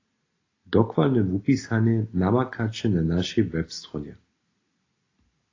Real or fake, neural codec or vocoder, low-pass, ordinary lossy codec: real; none; 7.2 kHz; AAC, 32 kbps